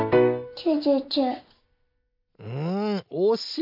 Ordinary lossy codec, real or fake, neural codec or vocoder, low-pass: MP3, 48 kbps; real; none; 5.4 kHz